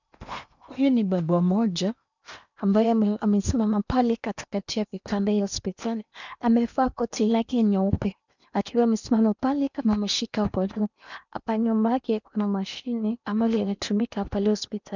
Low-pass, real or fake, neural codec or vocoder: 7.2 kHz; fake; codec, 16 kHz in and 24 kHz out, 0.8 kbps, FocalCodec, streaming, 65536 codes